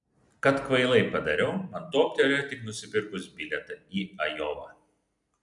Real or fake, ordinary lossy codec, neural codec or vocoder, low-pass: real; MP3, 96 kbps; none; 10.8 kHz